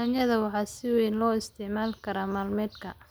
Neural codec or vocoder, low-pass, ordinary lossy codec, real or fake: none; none; none; real